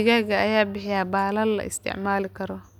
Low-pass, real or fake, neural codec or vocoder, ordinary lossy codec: 19.8 kHz; real; none; none